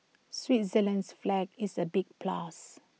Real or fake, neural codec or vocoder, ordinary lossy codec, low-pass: real; none; none; none